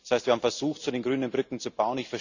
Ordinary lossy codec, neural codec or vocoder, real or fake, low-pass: none; none; real; 7.2 kHz